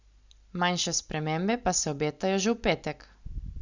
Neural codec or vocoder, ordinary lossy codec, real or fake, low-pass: none; Opus, 64 kbps; real; 7.2 kHz